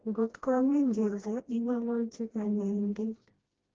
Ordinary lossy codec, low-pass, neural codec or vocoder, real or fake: Opus, 16 kbps; 7.2 kHz; codec, 16 kHz, 1 kbps, FreqCodec, smaller model; fake